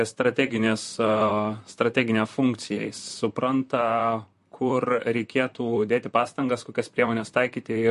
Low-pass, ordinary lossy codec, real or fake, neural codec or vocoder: 14.4 kHz; MP3, 48 kbps; fake; vocoder, 44.1 kHz, 128 mel bands, Pupu-Vocoder